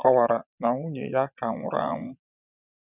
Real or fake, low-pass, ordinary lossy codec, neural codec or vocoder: fake; 3.6 kHz; none; vocoder, 22.05 kHz, 80 mel bands, WaveNeXt